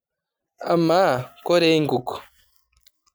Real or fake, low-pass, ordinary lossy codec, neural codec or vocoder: real; none; none; none